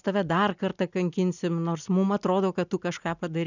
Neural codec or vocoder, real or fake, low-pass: none; real; 7.2 kHz